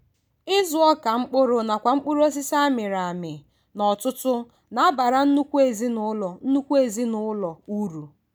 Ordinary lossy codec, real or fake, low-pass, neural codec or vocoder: none; real; none; none